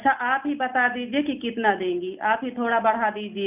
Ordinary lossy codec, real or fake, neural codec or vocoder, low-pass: none; real; none; 3.6 kHz